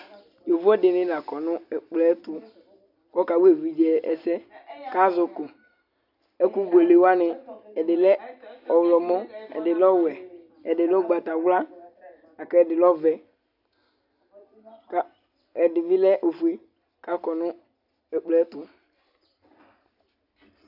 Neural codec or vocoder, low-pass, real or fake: none; 5.4 kHz; real